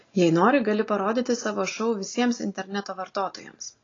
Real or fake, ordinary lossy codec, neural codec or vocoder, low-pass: real; AAC, 32 kbps; none; 7.2 kHz